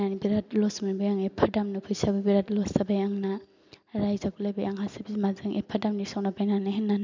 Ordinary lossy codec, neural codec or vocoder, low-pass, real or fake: MP3, 64 kbps; none; 7.2 kHz; real